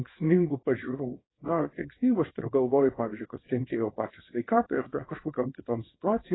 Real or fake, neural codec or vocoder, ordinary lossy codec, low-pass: fake; codec, 24 kHz, 0.9 kbps, WavTokenizer, small release; AAC, 16 kbps; 7.2 kHz